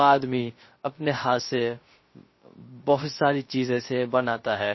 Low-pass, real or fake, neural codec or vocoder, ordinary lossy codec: 7.2 kHz; fake; codec, 16 kHz, 0.3 kbps, FocalCodec; MP3, 24 kbps